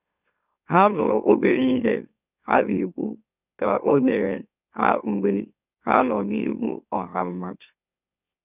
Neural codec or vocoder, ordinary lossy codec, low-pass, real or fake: autoencoder, 44.1 kHz, a latent of 192 numbers a frame, MeloTTS; none; 3.6 kHz; fake